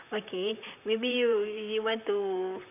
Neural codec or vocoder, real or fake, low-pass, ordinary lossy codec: vocoder, 44.1 kHz, 128 mel bands, Pupu-Vocoder; fake; 3.6 kHz; none